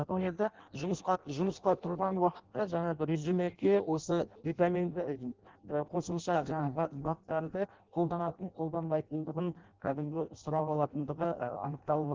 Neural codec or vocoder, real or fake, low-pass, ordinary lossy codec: codec, 16 kHz in and 24 kHz out, 0.6 kbps, FireRedTTS-2 codec; fake; 7.2 kHz; Opus, 16 kbps